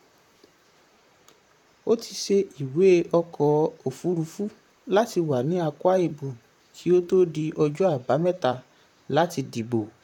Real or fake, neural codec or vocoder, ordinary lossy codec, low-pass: fake; vocoder, 44.1 kHz, 128 mel bands, Pupu-Vocoder; none; 19.8 kHz